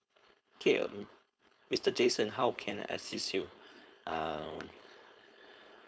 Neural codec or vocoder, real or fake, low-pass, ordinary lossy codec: codec, 16 kHz, 4.8 kbps, FACodec; fake; none; none